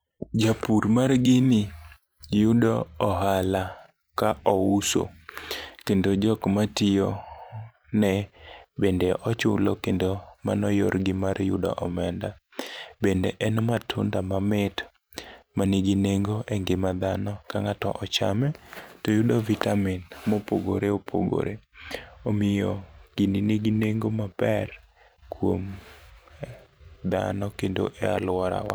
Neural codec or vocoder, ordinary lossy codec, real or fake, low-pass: vocoder, 44.1 kHz, 128 mel bands every 512 samples, BigVGAN v2; none; fake; none